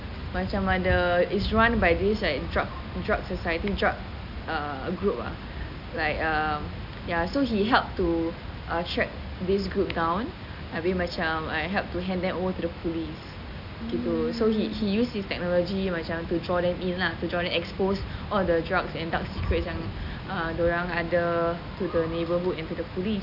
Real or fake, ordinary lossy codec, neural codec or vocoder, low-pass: real; none; none; 5.4 kHz